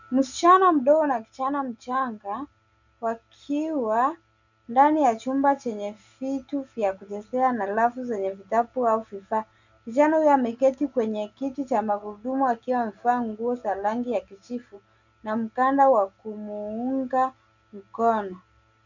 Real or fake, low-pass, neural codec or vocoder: real; 7.2 kHz; none